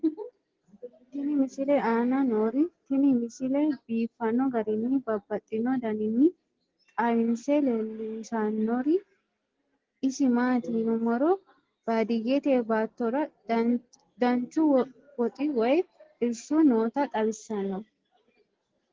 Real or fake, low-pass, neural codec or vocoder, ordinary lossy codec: real; 7.2 kHz; none; Opus, 16 kbps